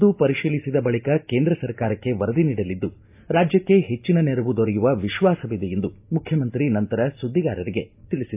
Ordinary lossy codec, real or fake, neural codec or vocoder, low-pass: AAC, 32 kbps; real; none; 3.6 kHz